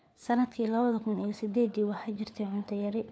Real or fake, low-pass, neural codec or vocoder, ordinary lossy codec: fake; none; codec, 16 kHz, 4 kbps, FunCodec, trained on LibriTTS, 50 frames a second; none